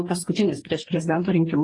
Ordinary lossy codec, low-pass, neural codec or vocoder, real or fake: AAC, 32 kbps; 10.8 kHz; codec, 44.1 kHz, 2.6 kbps, DAC; fake